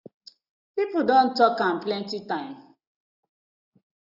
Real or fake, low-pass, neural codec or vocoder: real; 5.4 kHz; none